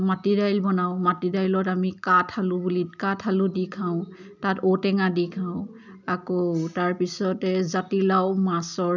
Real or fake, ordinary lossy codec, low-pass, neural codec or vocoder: real; none; 7.2 kHz; none